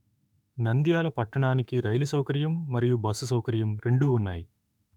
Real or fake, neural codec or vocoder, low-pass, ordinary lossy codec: fake; autoencoder, 48 kHz, 32 numbers a frame, DAC-VAE, trained on Japanese speech; 19.8 kHz; none